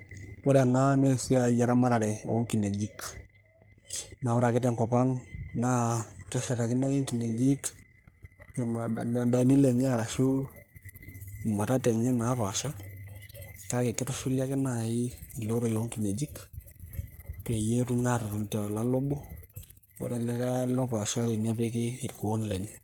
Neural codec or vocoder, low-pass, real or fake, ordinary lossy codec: codec, 44.1 kHz, 3.4 kbps, Pupu-Codec; none; fake; none